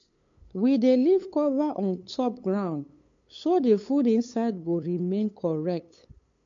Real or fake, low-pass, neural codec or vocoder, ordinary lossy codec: fake; 7.2 kHz; codec, 16 kHz, 8 kbps, FunCodec, trained on LibriTTS, 25 frames a second; MP3, 48 kbps